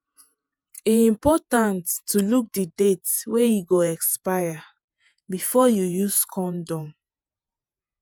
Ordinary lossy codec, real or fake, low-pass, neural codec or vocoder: none; fake; none; vocoder, 48 kHz, 128 mel bands, Vocos